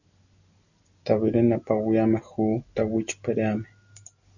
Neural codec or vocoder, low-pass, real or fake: none; 7.2 kHz; real